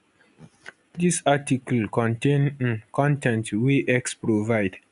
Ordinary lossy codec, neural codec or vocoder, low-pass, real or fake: none; none; 10.8 kHz; real